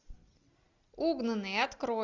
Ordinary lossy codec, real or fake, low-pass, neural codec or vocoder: Opus, 64 kbps; real; 7.2 kHz; none